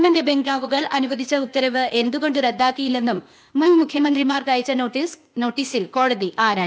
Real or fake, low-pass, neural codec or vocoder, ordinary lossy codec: fake; none; codec, 16 kHz, 0.8 kbps, ZipCodec; none